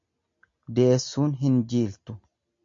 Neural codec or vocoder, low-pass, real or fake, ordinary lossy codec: none; 7.2 kHz; real; MP3, 48 kbps